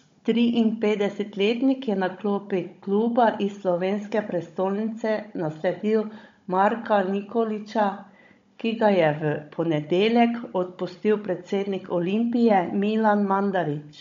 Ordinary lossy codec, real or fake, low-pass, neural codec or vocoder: MP3, 48 kbps; fake; 7.2 kHz; codec, 16 kHz, 16 kbps, FunCodec, trained on Chinese and English, 50 frames a second